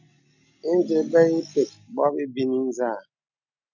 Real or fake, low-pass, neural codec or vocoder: real; 7.2 kHz; none